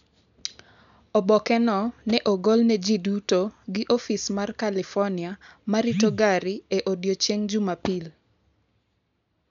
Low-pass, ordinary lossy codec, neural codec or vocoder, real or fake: 7.2 kHz; none; none; real